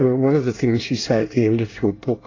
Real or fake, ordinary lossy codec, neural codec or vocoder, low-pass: fake; AAC, 32 kbps; codec, 24 kHz, 1 kbps, SNAC; 7.2 kHz